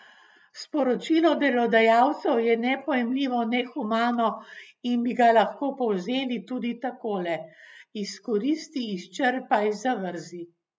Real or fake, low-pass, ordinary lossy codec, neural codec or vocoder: real; none; none; none